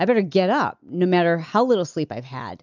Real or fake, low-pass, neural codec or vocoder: real; 7.2 kHz; none